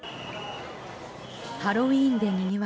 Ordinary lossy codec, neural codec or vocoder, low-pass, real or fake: none; none; none; real